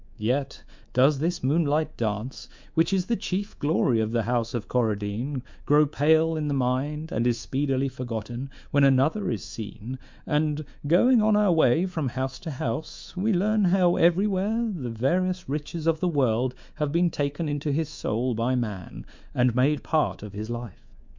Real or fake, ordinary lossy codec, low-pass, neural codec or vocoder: fake; MP3, 64 kbps; 7.2 kHz; codec, 24 kHz, 3.1 kbps, DualCodec